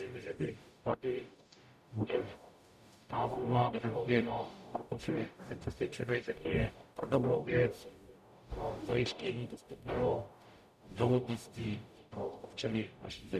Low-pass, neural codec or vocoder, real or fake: 14.4 kHz; codec, 44.1 kHz, 0.9 kbps, DAC; fake